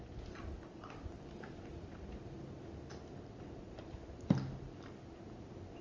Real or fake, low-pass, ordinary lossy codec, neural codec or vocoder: real; 7.2 kHz; Opus, 32 kbps; none